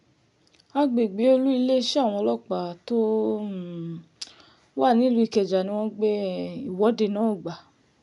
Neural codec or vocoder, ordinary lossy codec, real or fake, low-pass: none; none; real; 10.8 kHz